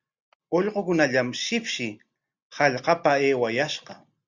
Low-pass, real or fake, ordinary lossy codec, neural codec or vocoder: 7.2 kHz; real; Opus, 64 kbps; none